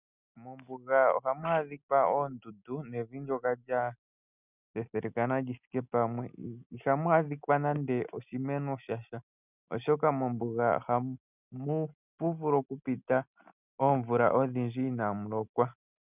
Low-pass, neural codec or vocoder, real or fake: 3.6 kHz; none; real